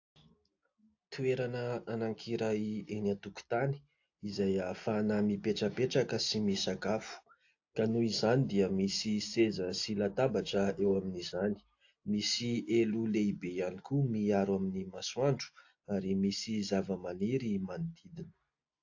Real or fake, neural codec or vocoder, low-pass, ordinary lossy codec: real; none; 7.2 kHz; AAC, 48 kbps